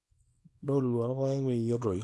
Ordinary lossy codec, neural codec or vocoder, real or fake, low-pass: none; codec, 24 kHz, 0.9 kbps, WavTokenizer, small release; fake; none